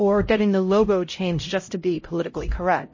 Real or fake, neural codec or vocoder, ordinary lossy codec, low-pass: fake; codec, 16 kHz, 0.5 kbps, X-Codec, HuBERT features, trained on LibriSpeech; MP3, 32 kbps; 7.2 kHz